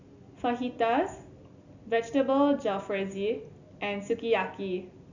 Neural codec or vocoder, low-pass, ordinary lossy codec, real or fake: none; 7.2 kHz; none; real